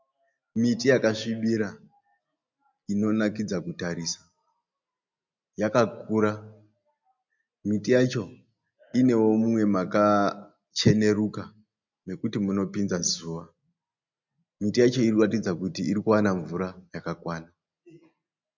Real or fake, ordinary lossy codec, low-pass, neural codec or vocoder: real; MP3, 64 kbps; 7.2 kHz; none